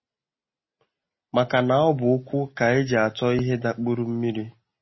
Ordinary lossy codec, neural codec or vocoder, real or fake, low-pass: MP3, 24 kbps; none; real; 7.2 kHz